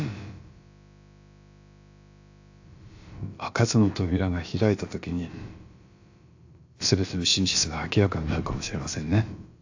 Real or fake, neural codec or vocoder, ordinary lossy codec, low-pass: fake; codec, 16 kHz, about 1 kbps, DyCAST, with the encoder's durations; AAC, 48 kbps; 7.2 kHz